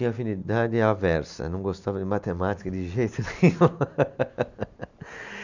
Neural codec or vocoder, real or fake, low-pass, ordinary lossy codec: none; real; 7.2 kHz; none